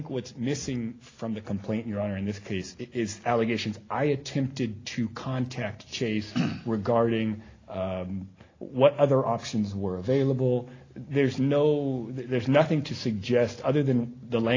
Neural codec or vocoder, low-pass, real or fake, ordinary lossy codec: none; 7.2 kHz; real; AAC, 32 kbps